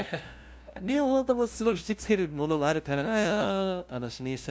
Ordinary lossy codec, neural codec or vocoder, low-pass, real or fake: none; codec, 16 kHz, 0.5 kbps, FunCodec, trained on LibriTTS, 25 frames a second; none; fake